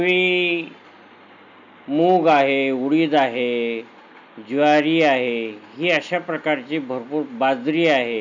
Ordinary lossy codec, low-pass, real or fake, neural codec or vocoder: none; 7.2 kHz; real; none